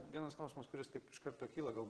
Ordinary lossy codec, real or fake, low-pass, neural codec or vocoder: Opus, 16 kbps; fake; 9.9 kHz; vocoder, 22.05 kHz, 80 mel bands, Vocos